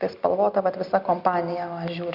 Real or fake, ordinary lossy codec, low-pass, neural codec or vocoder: real; Opus, 64 kbps; 5.4 kHz; none